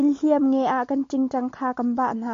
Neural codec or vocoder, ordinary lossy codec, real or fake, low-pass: none; MP3, 48 kbps; real; 7.2 kHz